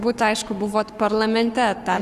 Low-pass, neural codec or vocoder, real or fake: 14.4 kHz; codec, 44.1 kHz, 7.8 kbps, Pupu-Codec; fake